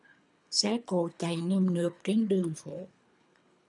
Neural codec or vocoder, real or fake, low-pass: codec, 24 kHz, 3 kbps, HILCodec; fake; 10.8 kHz